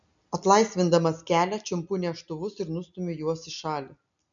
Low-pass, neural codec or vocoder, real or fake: 7.2 kHz; none; real